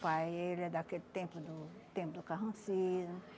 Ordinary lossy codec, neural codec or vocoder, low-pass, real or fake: none; none; none; real